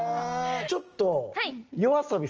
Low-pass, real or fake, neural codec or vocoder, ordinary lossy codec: 7.2 kHz; real; none; Opus, 24 kbps